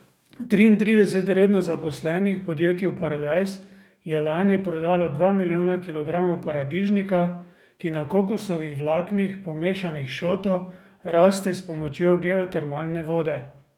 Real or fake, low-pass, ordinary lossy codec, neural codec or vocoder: fake; 19.8 kHz; none; codec, 44.1 kHz, 2.6 kbps, DAC